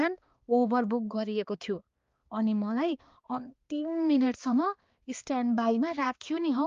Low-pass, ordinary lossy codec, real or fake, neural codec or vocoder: 7.2 kHz; Opus, 32 kbps; fake; codec, 16 kHz, 2 kbps, X-Codec, HuBERT features, trained on balanced general audio